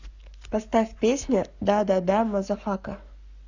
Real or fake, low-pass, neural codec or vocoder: fake; 7.2 kHz; codec, 44.1 kHz, 7.8 kbps, Pupu-Codec